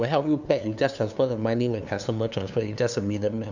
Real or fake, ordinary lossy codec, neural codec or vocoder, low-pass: fake; none; codec, 16 kHz, 2 kbps, FunCodec, trained on LibriTTS, 25 frames a second; 7.2 kHz